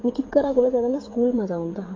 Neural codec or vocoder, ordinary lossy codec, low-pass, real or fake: codec, 16 kHz, 16 kbps, FreqCodec, smaller model; AAC, 32 kbps; 7.2 kHz; fake